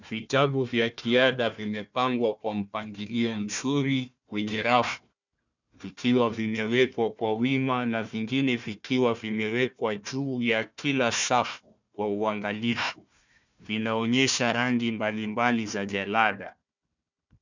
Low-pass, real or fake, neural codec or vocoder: 7.2 kHz; fake; codec, 16 kHz, 1 kbps, FunCodec, trained on Chinese and English, 50 frames a second